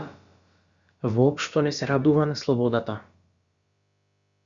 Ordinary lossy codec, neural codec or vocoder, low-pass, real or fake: Opus, 64 kbps; codec, 16 kHz, about 1 kbps, DyCAST, with the encoder's durations; 7.2 kHz; fake